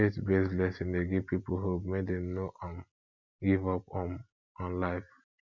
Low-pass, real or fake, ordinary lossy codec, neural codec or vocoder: 7.2 kHz; real; MP3, 64 kbps; none